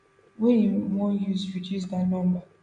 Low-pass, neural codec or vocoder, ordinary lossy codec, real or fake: 9.9 kHz; none; none; real